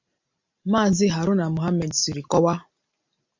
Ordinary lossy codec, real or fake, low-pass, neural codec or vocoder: MP3, 64 kbps; real; 7.2 kHz; none